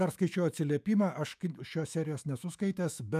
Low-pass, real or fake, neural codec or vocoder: 14.4 kHz; fake; autoencoder, 48 kHz, 128 numbers a frame, DAC-VAE, trained on Japanese speech